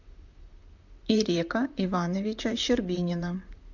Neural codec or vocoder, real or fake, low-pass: vocoder, 44.1 kHz, 128 mel bands, Pupu-Vocoder; fake; 7.2 kHz